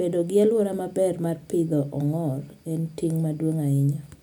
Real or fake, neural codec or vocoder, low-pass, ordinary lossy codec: real; none; none; none